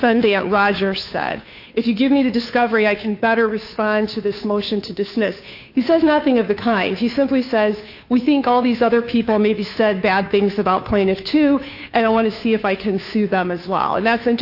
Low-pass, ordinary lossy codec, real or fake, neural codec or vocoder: 5.4 kHz; AAC, 48 kbps; fake; codec, 16 kHz, 2 kbps, FunCodec, trained on Chinese and English, 25 frames a second